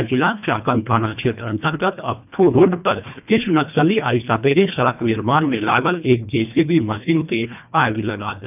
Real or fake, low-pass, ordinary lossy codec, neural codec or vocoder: fake; 3.6 kHz; none; codec, 24 kHz, 1.5 kbps, HILCodec